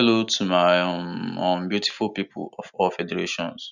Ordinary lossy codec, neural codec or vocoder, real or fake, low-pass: none; none; real; 7.2 kHz